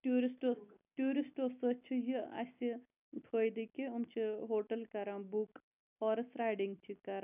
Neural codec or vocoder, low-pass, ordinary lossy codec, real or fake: none; 3.6 kHz; none; real